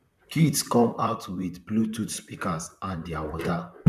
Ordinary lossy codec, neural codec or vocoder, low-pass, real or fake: none; vocoder, 44.1 kHz, 128 mel bands, Pupu-Vocoder; 14.4 kHz; fake